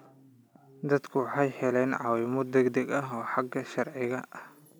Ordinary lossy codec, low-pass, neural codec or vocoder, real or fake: none; none; none; real